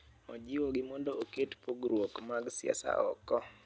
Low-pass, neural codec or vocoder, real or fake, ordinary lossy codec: none; none; real; none